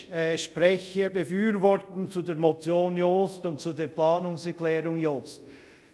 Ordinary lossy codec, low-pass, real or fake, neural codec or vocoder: none; none; fake; codec, 24 kHz, 0.5 kbps, DualCodec